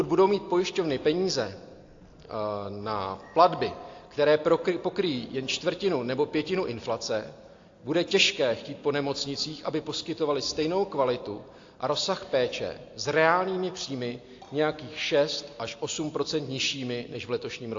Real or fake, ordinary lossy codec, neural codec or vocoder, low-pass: real; AAC, 48 kbps; none; 7.2 kHz